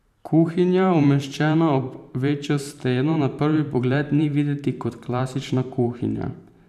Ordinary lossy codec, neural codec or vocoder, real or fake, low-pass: none; vocoder, 44.1 kHz, 128 mel bands every 512 samples, BigVGAN v2; fake; 14.4 kHz